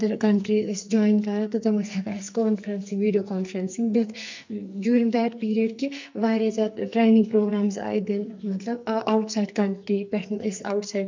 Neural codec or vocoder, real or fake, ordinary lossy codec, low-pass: codec, 44.1 kHz, 2.6 kbps, SNAC; fake; MP3, 64 kbps; 7.2 kHz